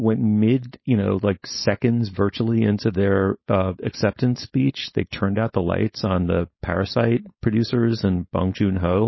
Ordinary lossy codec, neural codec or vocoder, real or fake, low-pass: MP3, 24 kbps; codec, 16 kHz, 4.8 kbps, FACodec; fake; 7.2 kHz